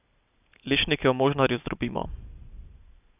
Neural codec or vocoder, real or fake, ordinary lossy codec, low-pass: none; real; none; 3.6 kHz